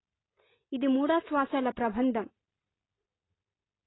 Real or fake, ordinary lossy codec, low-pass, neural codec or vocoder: real; AAC, 16 kbps; 7.2 kHz; none